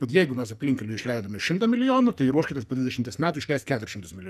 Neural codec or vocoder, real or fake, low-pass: codec, 44.1 kHz, 2.6 kbps, SNAC; fake; 14.4 kHz